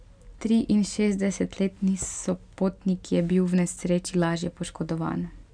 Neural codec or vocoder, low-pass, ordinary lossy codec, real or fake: none; 9.9 kHz; none; real